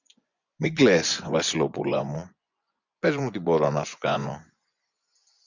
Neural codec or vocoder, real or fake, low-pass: vocoder, 24 kHz, 100 mel bands, Vocos; fake; 7.2 kHz